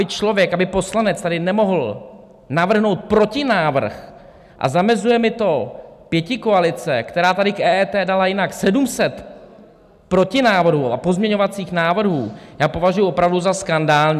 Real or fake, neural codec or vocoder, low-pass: real; none; 14.4 kHz